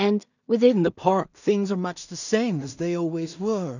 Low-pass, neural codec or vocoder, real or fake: 7.2 kHz; codec, 16 kHz in and 24 kHz out, 0.4 kbps, LongCat-Audio-Codec, two codebook decoder; fake